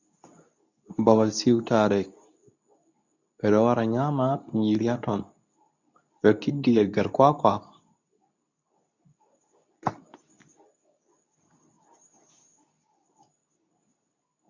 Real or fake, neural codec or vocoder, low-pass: fake; codec, 24 kHz, 0.9 kbps, WavTokenizer, medium speech release version 2; 7.2 kHz